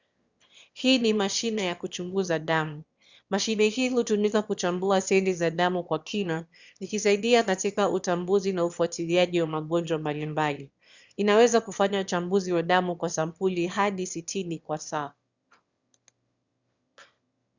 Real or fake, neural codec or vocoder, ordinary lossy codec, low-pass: fake; autoencoder, 22.05 kHz, a latent of 192 numbers a frame, VITS, trained on one speaker; Opus, 64 kbps; 7.2 kHz